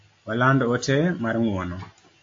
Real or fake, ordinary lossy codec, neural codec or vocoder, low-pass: real; AAC, 48 kbps; none; 7.2 kHz